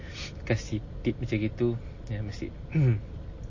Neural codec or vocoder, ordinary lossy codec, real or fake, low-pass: none; MP3, 32 kbps; real; 7.2 kHz